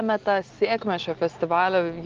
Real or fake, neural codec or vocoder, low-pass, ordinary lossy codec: real; none; 7.2 kHz; Opus, 16 kbps